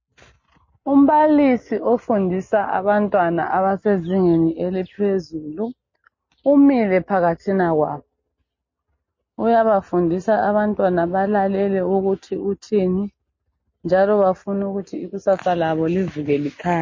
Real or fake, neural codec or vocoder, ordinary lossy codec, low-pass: real; none; MP3, 32 kbps; 7.2 kHz